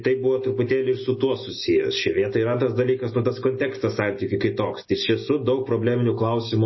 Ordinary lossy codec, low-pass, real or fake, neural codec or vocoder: MP3, 24 kbps; 7.2 kHz; real; none